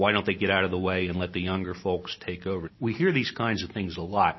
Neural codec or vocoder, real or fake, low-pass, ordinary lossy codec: none; real; 7.2 kHz; MP3, 24 kbps